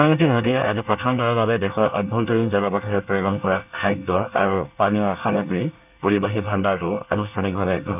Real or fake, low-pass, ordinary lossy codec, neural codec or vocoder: fake; 3.6 kHz; none; codec, 24 kHz, 1 kbps, SNAC